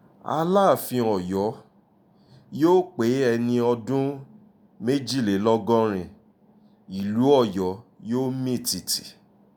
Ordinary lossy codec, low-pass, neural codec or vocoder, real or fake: none; none; none; real